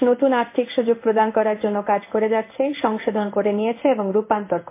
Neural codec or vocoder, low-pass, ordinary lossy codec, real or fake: codec, 16 kHz in and 24 kHz out, 1 kbps, XY-Tokenizer; 3.6 kHz; MP3, 24 kbps; fake